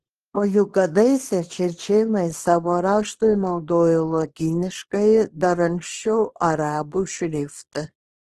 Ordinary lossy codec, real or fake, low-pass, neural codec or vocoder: Opus, 16 kbps; fake; 10.8 kHz; codec, 24 kHz, 0.9 kbps, WavTokenizer, small release